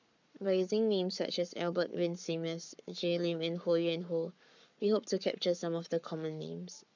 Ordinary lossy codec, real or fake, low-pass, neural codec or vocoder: none; fake; 7.2 kHz; codec, 44.1 kHz, 7.8 kbps, Pupu-Codec